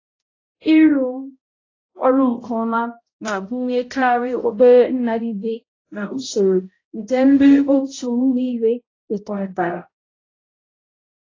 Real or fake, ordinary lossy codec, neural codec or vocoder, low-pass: fake; AAC, 32 kbps; codec, 16 kHz, 0.5 kbps, X-Codec, HuBERT features, trained on balanced general audio; 7.2 kHz